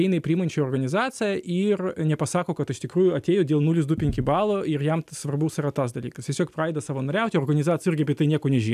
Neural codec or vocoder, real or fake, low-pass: none; real; 14.4 kHz